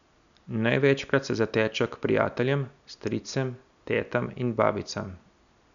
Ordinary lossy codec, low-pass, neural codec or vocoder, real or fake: none; 7.2 kHz; none; real